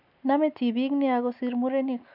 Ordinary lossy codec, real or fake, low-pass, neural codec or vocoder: none; real; 5.4 kHz; none